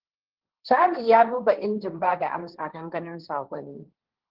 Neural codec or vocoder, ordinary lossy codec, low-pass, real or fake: codec, 16 kHz, 1.1 kbps, Voila-Tokenizer; Opus, 16 kbps; 5.4 kHz; fake